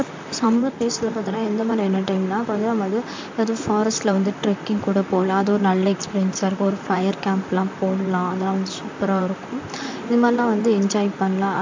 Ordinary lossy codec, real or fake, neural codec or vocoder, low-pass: MP3, 64 kbps; fake; vocoder, 44.1 kHz, 128 mel bands, Pupu-Vocoder; 7.2 kHz